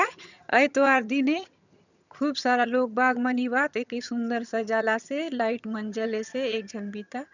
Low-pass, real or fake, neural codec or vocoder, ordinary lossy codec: 7.2 kHz; fake; vocoder, 22.05 kHz, 80 mel bands, HiFi-GAN; none